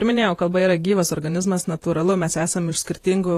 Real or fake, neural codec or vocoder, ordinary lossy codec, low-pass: fake; vocoder, 48 kHz, 128 mel bands, Vocos; AAC, 48 kbps; 14.4 kHz